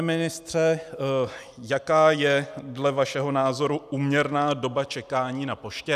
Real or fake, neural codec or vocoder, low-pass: real; none; 14.4 kHz